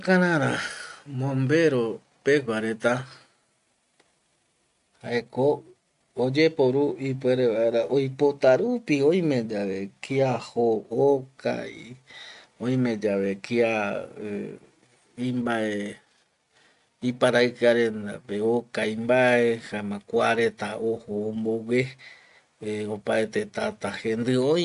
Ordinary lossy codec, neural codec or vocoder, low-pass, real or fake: none; none; 10.8 kHz; real